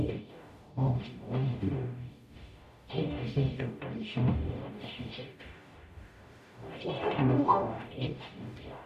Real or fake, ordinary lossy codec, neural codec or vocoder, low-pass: fake; none; codec, 44.1 kHz, 0.9 kbps, DAC; 14.4 kHz